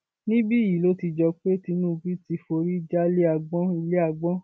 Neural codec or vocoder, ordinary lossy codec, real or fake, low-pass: none; none; real; none